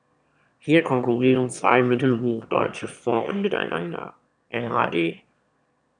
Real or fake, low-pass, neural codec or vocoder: fake; 9.9 kHz; autoencoder, 22.05 kHz, a latent of 192 numbers a frame, VITS, trained on one speaker